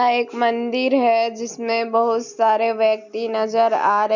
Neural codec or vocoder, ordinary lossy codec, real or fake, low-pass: none; none; real; 7.2 kHz